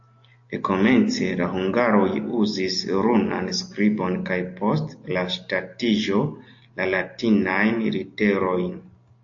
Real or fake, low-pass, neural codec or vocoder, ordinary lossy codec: real; 7.2 kHz; none; AAC, 48 kbps